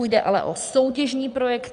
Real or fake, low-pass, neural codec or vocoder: fake; 9.9 kHz; vocoder, 22.05 kHz, 80 mel bands, Vocos